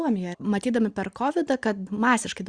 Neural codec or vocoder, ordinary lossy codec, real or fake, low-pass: none; Opus, 64 kbps; real; 9.9 kHz